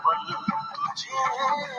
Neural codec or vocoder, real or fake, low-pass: none; real; 10.8 kHz